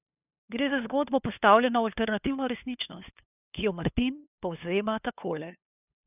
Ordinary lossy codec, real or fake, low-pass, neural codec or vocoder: none; fake; 3.6 kHz; codec, 16 kHz, 2 kbps, FunCodec, trained on LibriTTS, 25 frames a second